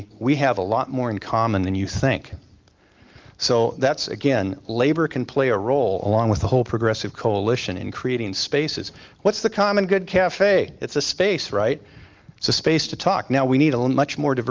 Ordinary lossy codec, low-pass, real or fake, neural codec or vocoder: Opus, 32 kbps; 7.2 kHz; real; none